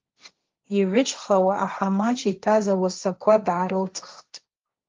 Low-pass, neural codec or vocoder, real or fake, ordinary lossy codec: 7.2 kHz; codec, 16 kHz, 1.1 kbps, Voila-Tokenizer; fake; Opus, 24 kbps